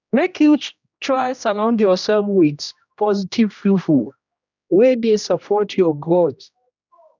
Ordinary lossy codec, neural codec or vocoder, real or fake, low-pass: none; codec, 16 kHz, 1 kbps, X-Codec, HuBERT features, trained on general audio; fake; 7.2 kHz